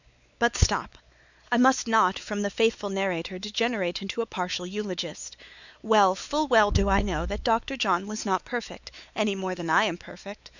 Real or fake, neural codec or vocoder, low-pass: fake; codec, 16 kHz, 4 kbps, X-Codec, WavLM features, trained on Multilingual LibriSpeech; 7.2 kHz